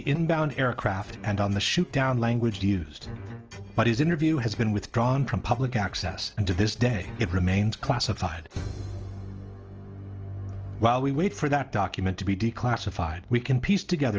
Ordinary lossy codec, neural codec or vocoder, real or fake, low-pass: Opus, 24 kbps; none; real; 7.2 kHz